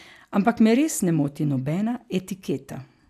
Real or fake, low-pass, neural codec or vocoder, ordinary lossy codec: real; 14.4 kHz; none; AAC, 96 kbps